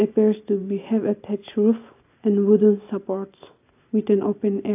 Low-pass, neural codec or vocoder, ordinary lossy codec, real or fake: 3.6 kHz; none; AAC, 32 kbps; real